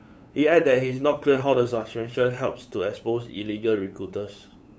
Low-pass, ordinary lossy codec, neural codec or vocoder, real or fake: none; none; codec, 16 kHz, 8 kbps, FunCodec, trained on LibriTTS, 25 frames a second; fake